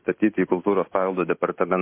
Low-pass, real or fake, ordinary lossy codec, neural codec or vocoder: 3.6 kHz; real; MP3, 24 kbps; none